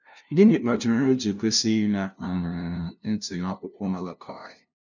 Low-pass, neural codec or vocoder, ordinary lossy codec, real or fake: 7.2 kHz; codec, 16 kHz, 0.5 kbps, FunCodec, trained on LibriTTS, 25 frames a second; none; fake